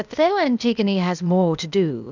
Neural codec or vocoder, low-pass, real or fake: codec, 16 kHz, 0.8 kbps, ZipCodec; 7.2 kHz; fake